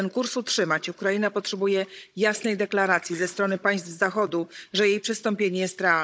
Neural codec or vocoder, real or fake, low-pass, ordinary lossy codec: codec, 16 kHz, 16 kbps, FunCodec, trained on Chinese and English, 50 frames a second; fake; none; none